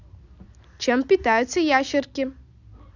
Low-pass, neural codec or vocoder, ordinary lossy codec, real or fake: 7.2 kHz; none; none; real